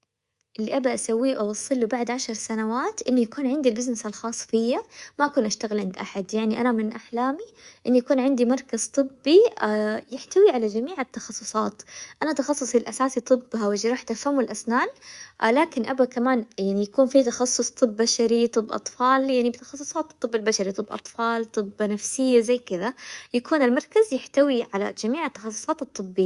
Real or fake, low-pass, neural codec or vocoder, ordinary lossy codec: fake; 10.8 kHz; codec, 24 kHz, 3.1 kbps, DualCodec; Opus, 64 kbps